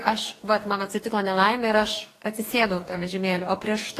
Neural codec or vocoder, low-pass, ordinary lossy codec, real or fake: codec, 44.1 kHz, 2.6 kbps, DAC; 14.4 kHz; AAC, 48 kbps; fake